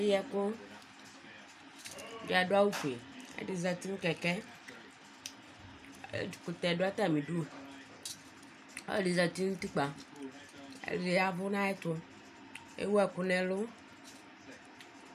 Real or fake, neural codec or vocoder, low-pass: real; none; 14.4 kHz